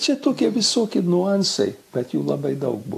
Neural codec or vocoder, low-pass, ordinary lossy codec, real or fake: none; 14.4 kHz; AAC, 64 kbps; real